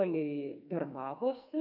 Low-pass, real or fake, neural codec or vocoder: 5.4 kHz; fake; codec, 44.1 kHz, 2.6 kbps, SNAC